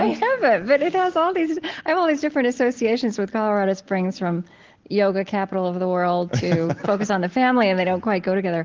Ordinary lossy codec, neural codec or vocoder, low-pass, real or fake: Opus, 16 kbps; none; 7.2 kHz; real